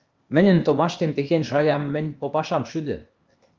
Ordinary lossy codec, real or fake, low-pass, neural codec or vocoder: Opus, 32 kbps; fake; 7.2 kHz; codec, 16 kHz, 0.8 kbps, ZipCodec